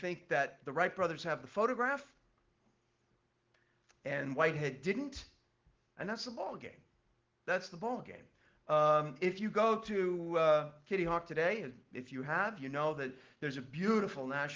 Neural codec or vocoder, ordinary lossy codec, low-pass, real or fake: none; Opus, 16 kbps; 7.2 kHz; real